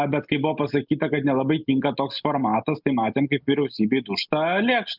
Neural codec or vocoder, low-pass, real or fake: none; 5.4 kHz; real